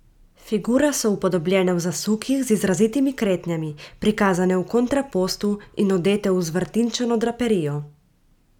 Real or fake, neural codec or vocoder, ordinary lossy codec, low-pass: real; none; none; 19.8 kHz